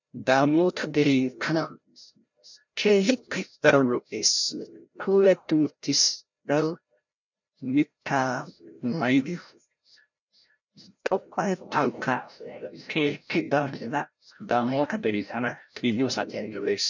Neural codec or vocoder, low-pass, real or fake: codec, 16 kHz, 0.5 kbps, FreqCodec, larger model; 7.2 kHz; fake